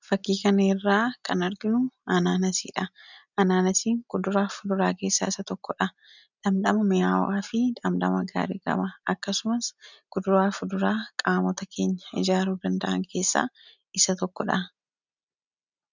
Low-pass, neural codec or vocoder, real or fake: 7.2 kHz; none; real